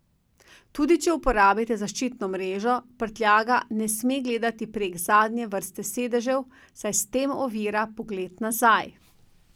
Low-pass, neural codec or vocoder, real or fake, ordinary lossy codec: none; none; real; none